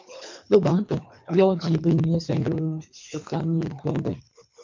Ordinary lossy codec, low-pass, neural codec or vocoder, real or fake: MP3, 64 kbps; 7.2 kHz; codec, 24 kHz, 3 kbps, HILCodec; fake